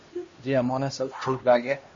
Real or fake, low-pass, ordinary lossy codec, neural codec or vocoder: fake; 7.2 kHz; MP3, 32 kbps; codec, 16 kHz, 1 kbps, X-Codec, HuBERT features, trained on LibriSpeech